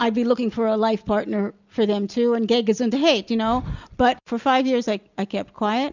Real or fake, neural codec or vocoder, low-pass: real; none; 7.2 kHz